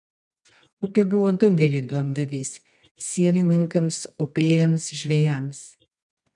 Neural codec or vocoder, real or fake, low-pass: codec, 24 kHz, 0.9 kbps, WavTokenizer, medium music audio release; fake; 10.8 kHz